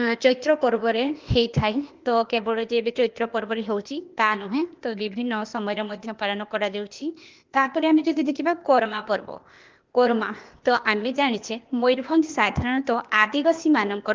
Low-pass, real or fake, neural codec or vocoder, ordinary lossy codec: 7.2 kHz; fake; codec, 16 kHz, 0.8 kbps, ZipCodec; Opus, 32 kbps